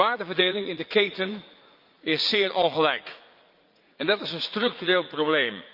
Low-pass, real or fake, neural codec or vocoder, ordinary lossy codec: 5.4 kHz; fake; vocoder, 44.1 kHz, 80 mel bands, Vocos; Opus, 24 kbps